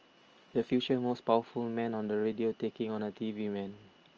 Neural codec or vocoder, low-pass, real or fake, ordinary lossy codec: none; 7.2 kHz; real; Opus, 24 kbps